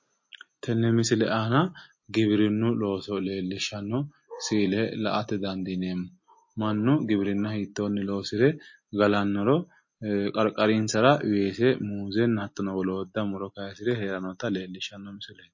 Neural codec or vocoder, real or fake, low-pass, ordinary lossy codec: none; real; 7.2 kHz; MP3, 32 kbps